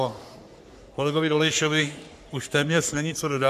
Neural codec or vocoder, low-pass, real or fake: codec, 44.1 kHz, 3.4 kbps, Pupu-Codec; 14.4 kHz; fake